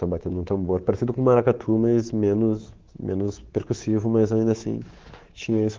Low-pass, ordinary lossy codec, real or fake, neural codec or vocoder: 7.2 kHz; Opus, 16 kbps; fake; codec, 24 kHz, 3.1 kbps, DualCodec